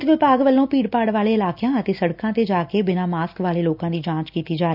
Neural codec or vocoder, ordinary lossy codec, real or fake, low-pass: none; none; real; 5.4 kHz